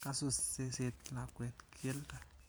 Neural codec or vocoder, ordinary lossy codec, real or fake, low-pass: vocoder, 44.1 kHz, 128 mel bands every 512 samples, BigVGAN v2; none; fake; none